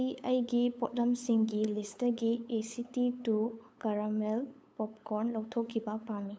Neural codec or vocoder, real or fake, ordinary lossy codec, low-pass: codec, 16 kHz, 8 kbps, FunCodec, trained on LibriTTS, 25 frames a second; fake; none; none